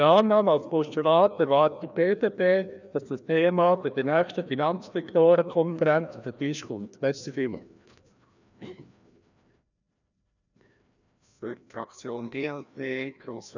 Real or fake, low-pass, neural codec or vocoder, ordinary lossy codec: fake; 7.2 kHz; codec, 16 kHz, 1 kbps, FreqCodec, larger model; none